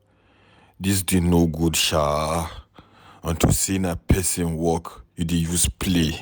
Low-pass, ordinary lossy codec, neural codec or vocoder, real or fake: none; none; none; real